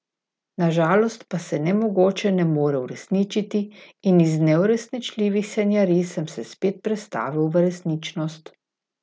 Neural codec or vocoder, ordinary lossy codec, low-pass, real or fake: none; none; none; real